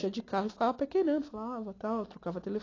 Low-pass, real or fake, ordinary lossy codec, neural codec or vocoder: 7.2 kHz; real; AAC, 32 kbps; none